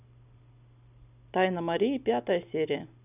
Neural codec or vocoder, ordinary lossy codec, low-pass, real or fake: none; none; 3.6 kHz; real